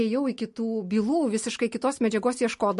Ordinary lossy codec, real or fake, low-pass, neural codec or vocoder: MP3, 48 kbps; real; 14.4 kHz; none